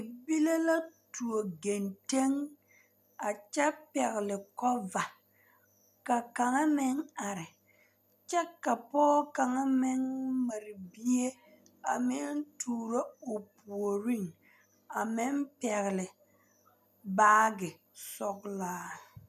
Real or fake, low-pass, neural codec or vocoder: real; 14.4 kHz; none